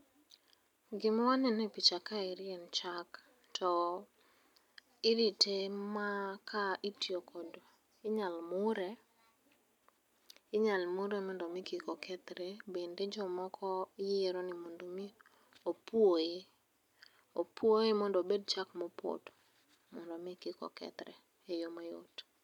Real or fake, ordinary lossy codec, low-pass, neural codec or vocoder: real; none; 19.8 kHz; none